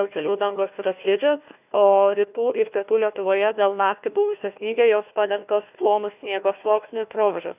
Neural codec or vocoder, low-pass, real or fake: codec, 16 kHz, 1 kbps, FunCodec, trained on Chinese and English, 50 frames a second; 3.6 kHz; fake